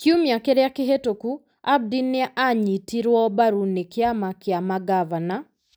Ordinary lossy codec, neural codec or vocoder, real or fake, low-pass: none; none; real; none